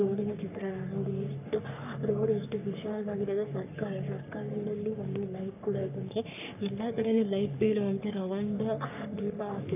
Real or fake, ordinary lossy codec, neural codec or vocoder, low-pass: fake; none; codec, 44.1 kHz, 3.4 kbps, Pupu-Codec; 3.6 kHz